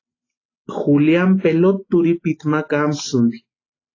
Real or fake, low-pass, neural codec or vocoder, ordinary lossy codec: real; 7.2 kHz; none; AAC, 32 kbps